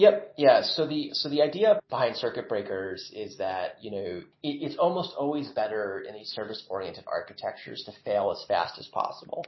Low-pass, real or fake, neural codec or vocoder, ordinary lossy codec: 7.2 kHz; real; none; MP3, 24 kbps